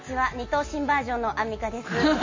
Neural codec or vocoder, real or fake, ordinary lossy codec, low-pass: none; real; MP3, 32 kbps; 7.2 kHz